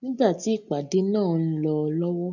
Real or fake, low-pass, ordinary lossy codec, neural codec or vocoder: real; 7.2 kHz; none; none